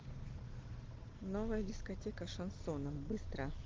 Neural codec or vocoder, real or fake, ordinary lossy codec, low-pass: none; real; Opus, 16 kbps; 7.2 kHz